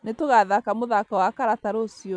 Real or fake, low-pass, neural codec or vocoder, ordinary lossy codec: real; 9.9 kHz; none; none